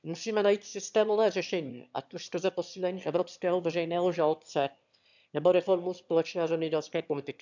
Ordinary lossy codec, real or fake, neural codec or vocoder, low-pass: none; fake; autoencoder, 22.05 kHz, a latent of 192 numbers a frame, VITS, trained on one speaker; 7.2 kHz